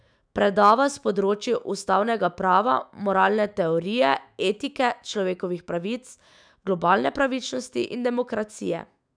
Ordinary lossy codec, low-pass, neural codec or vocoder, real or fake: none; 9.9 kHz; autoencoder, 48 kHz, 128 numbers a frame, DAC-VAE, trained on Japanese speech; fake